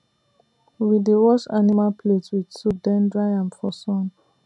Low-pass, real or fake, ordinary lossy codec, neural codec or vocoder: 10.8 kHz; real; none; none